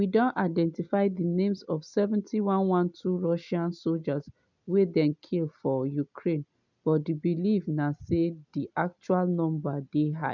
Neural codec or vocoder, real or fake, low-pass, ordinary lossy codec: none; real; 7.2 kHz; none